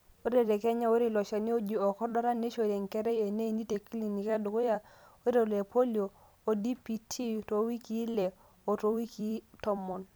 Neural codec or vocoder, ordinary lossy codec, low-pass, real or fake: vocoder, 44.1 kHz, 128 mel bands every 256 samples, BigVGAN v2; none; none; fake